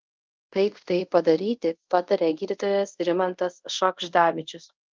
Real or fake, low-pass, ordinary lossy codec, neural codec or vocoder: fake; 7.2 kHz; Opus, 24 kbps; codec, 24 kHz, 0.5 kbps, DualCodec